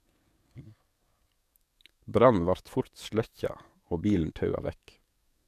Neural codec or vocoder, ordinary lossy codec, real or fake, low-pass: codec, 44.1 kHz, 7.8 kbps, DAC; MP3, 96 kbps; fake; 14.4 kHz